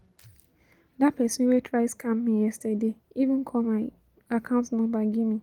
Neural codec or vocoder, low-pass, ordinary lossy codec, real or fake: none; 19.8 kHz; Opus, 24 kbps; real